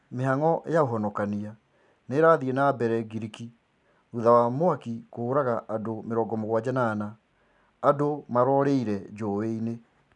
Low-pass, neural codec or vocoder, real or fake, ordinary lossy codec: 10.8 kHz; none; real; none